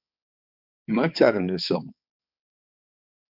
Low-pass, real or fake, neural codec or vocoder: 5.4 kHz; fake; codec, 16 kHz, 4 kbps, X-Codec, HuBERT features, trained on balanced general audio